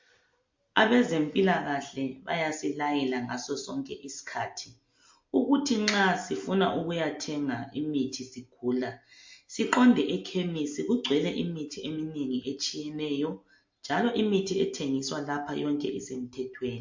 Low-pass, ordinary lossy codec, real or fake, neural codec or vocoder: 7.2 kHz; MP3, 48 kbps; real; none